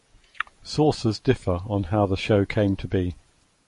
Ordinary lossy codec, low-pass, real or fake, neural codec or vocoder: MP3, 48 kbps; 14.4 kHz; fake; vocoder, 48 kHz, 128 mel bands, Vocos